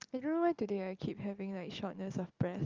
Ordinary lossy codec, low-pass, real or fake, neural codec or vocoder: Opus, 32 kbps; 7.2 kHz; real; none